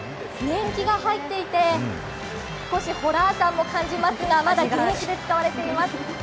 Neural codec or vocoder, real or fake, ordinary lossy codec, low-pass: none; real; none; none